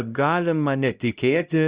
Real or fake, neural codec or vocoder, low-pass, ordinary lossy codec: fake; codec, 16 kHz, 0.5 kbps, X-Codec, HuBERT features, trained on LibriSpeech; 3.6 kHz; Opus, 64 kbps